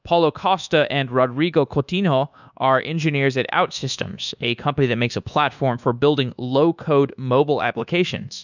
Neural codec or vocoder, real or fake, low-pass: codec, 24 kHz, 1.2 kbps, DualCodec; fake; 7.2 kHz